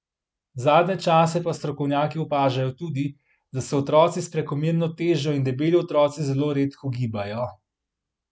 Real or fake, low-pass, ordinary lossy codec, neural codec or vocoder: real; none; none; none